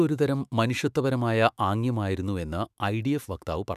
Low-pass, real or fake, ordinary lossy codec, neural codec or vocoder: 14.4 kHz; fake; none; autoencoder, 48 kHz, 128 numbers a frame, DAC-VAE, trained on Japanese speech